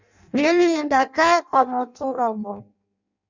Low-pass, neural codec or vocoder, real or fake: 7.2 kHz; codec, 16 kHz in and 24 kHz out, 0.6 kbps, FireRedTTS-2 codec; fake